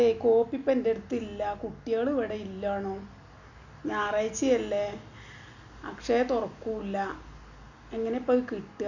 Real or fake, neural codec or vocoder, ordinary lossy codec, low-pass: real; none; none; 7.2 kHz